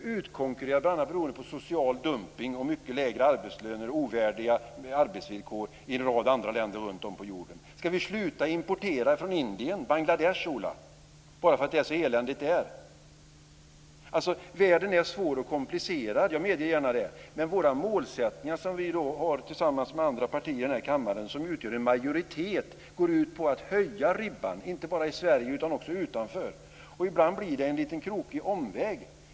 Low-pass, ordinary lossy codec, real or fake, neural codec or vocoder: none; none; real; none